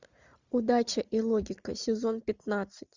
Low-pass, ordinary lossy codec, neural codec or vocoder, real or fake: 7.2 kHz; Opus, 64 kbps; none; real